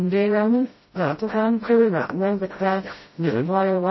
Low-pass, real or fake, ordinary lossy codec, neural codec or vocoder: 7.2 kHz; fake; MP3, 24 kbps; codec, 16 kHz, 0.5 kbps, FreqCodec, smaller model